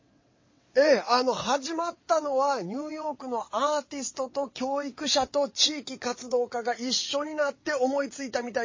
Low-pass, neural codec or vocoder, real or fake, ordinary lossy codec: 7.2 kHz; vocoder, 22.05 kHz, 80 mel bands, WaveNeXt; fake; MP3, 32 kbps